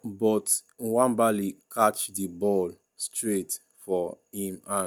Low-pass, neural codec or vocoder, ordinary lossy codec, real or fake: none; none; none; real